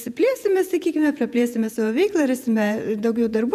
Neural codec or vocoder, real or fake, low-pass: vocoder, 48 kHz, 128 mel bands, Vocos; fake; 14.4 kHz